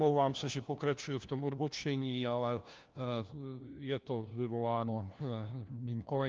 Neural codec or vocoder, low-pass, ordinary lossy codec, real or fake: codec, 16 kHz, 1 kbps, FunCodec, trained on LibriTTS, 50 frames a second; 7.2 kHz; Opus, 24 kbps; fake